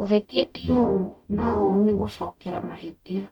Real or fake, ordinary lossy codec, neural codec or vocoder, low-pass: fake; none; codec, 44.1 kHz, 0.9 kbps, DAC; 19.8 kHz